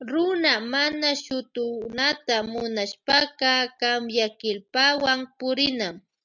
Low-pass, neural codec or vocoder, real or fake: 7.2 kHz; none; real